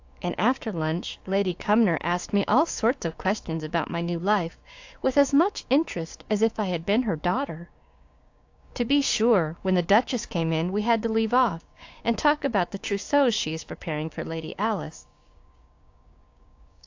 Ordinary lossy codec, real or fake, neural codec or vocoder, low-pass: AAC, 48 kbps; fake; codec, 16 kHz, 6 kbps, DAC; 7.2 kHz